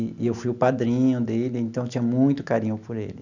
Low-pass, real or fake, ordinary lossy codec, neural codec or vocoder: 7.2 kHz; real; none; none